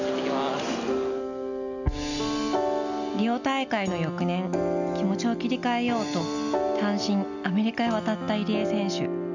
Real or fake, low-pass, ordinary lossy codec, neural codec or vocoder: real; 7.2 kHz; none; none